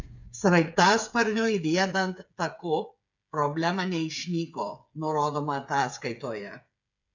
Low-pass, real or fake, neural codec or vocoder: 7.2 kHz; fake; codec, 16 kHz, 8 kbps, FreqCodec, smaller model